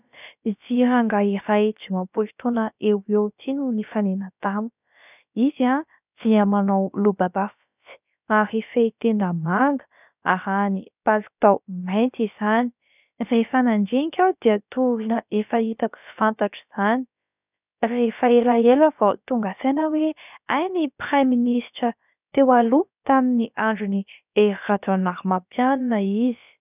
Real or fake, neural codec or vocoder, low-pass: fake; codec, 16 kHz, about 1 kbps, DyCAST, with the encoder's durations; 3.6 kHz